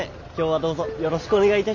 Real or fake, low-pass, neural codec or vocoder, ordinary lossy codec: real; 7.2 kHz; none; none